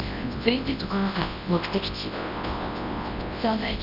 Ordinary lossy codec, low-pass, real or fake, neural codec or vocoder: none; 5.4 kHz; fake; codec, 24 kHz, 0.9 kbps, WavTokenizer, large speech release